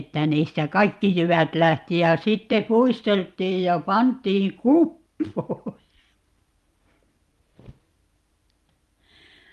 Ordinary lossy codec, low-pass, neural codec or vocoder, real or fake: Opus, 32 kbps; 14.4 kHz; vocoder, 44.1 kHz, 128 mel bands every 512 samples, BigVGAN v2; fake